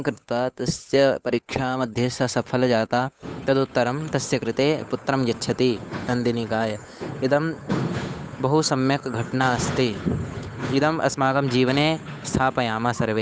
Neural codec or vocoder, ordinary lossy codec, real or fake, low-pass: codec, 16 kHz, 8 kbps, FunCodec, trained on Chinese and English, 25 frames a second; none; fake; none